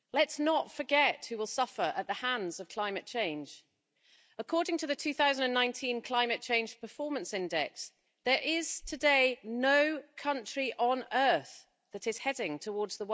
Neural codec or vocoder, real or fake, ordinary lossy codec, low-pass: none; real; none; none